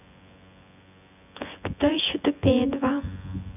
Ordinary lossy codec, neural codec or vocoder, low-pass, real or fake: none; vocoder, 24 kHz, 100 mel bands, Vocos; 3.6 kHz; fake